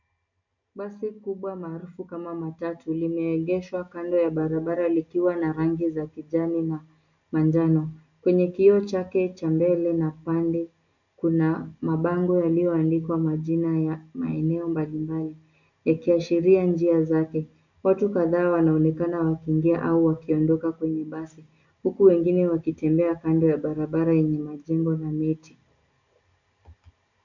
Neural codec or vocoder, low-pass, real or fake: none; 7.2 kHz; real